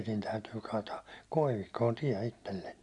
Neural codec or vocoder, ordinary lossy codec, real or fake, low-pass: none; none; real; 10.8 kHz